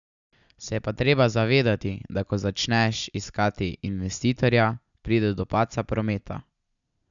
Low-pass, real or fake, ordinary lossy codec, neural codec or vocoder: 7.2 kHz; real; none; none